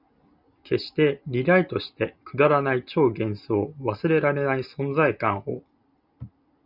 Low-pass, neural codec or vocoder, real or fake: 5.4 kHz; none; real